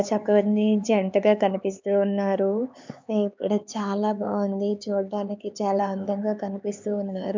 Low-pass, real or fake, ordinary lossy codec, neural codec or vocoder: 7.2 kHz; fake; MP3, 64 kbps; codec, 16 kHz, 4 kbps, X-Codec, HuBERT features, trained on LibriSpeech